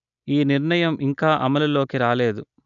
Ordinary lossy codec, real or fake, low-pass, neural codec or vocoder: none; real; 7.2 kHz; none